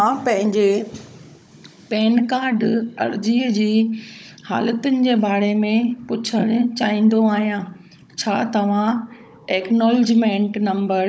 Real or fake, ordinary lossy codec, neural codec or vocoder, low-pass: fake; none; codec, 16 kHz, 16 kbps, FunCodec, trained on Chinese and English, 50 frames a second; none